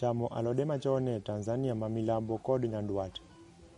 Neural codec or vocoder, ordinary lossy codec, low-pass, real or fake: none; MP3, 48 kbps; 19.8 kHz; real